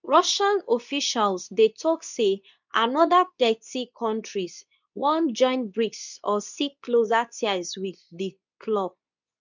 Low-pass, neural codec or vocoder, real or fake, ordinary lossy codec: 7.2 kHz; codec, 24 kHz, 0.9 kbps, WavTokenizer, medium speech release version 2; fake; none